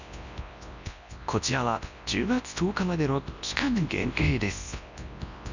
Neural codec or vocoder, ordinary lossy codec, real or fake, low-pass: codec, 24 kHz, 0.9 kbps, WavTokenizer, large speech release; none; fake; 7.2 kHz